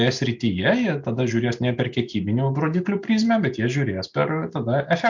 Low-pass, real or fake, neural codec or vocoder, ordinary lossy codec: 7.2 kHz; real; none; MP3, 64 kbps